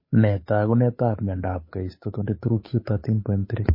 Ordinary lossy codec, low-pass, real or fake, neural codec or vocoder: MP3, 24 kbps; 5.4 kHz; fake; codec, 16 kHz, 8 kbps, FunCodec, trained on Chinese and English, 25 frames a second